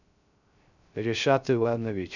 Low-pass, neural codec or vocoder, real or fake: 7.2 kHz; codec, 16 kHz, 0.3 kbps, FocalCodec; fake